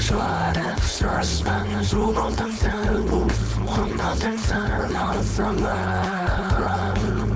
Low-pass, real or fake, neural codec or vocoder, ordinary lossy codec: none; fake; codec, 16 kHz, 4.8 kbps, FACodec; none